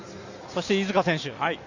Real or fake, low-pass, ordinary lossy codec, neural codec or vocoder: real; 7.2 kHz; Opus, 64 kbps; none